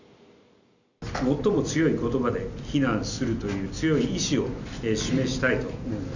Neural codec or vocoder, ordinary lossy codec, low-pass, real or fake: none; none; 7.2 kHz; real